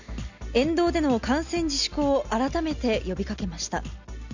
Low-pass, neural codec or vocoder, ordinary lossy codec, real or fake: 7.2 kHz; none; none; real